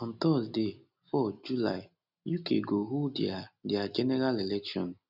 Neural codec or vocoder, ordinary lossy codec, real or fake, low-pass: none; none; real; 5.4 kHz